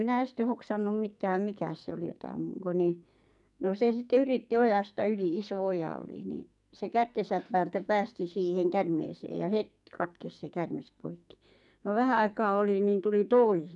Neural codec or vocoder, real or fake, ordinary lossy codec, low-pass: codec, 44.1 kHz, 2.6 kbps, SNAC; fake; none; 10.8 kHz